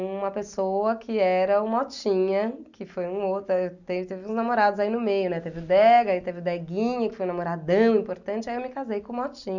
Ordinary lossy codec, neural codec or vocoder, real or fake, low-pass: none; none; real; 7.2 kHz